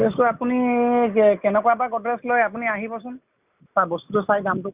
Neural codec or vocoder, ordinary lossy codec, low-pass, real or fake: none; Opus, 64 kbps; 3.6 kHz; real